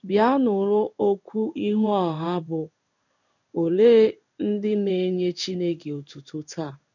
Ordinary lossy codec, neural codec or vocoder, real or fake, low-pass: none; codec, 16 kHz in and 24 kHz out, 1 kbps, XY-Tokenizer; fake; 7.2 kHz